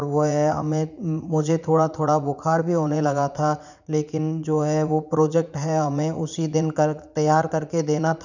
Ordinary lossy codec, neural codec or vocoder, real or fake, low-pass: none; vocoder, 44.1 kHz, 80 mel bands, Vocos; fake; 7.2 kHz